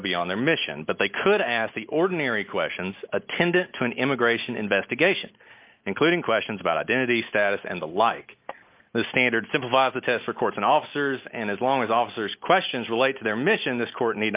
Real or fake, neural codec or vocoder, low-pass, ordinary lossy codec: real; none; 3.6 kHz; Opus, 24 kbps